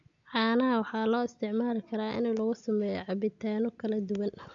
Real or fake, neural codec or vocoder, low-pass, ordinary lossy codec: real; none; 7.2 kHz; MP3, 64 kbps